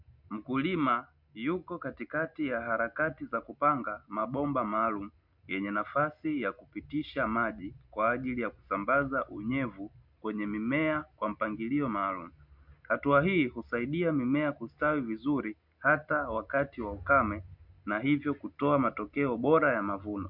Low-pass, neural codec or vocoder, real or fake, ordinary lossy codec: 5.4 kHz; none; real; MP3, 48 kbps